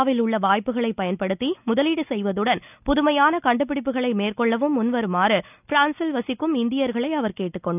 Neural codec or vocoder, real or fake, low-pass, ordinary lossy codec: none; real; 3.6 kHz; none